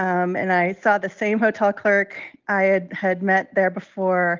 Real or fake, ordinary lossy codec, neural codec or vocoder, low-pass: real; Opus, 24 kbps; none; 7.2 kHz